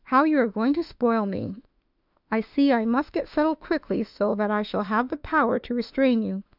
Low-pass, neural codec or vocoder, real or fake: 5.4 kHz; autoencoder, 48 kHz, 32 numbers a frame, DAC-VAE, trained on Japanese speech; fake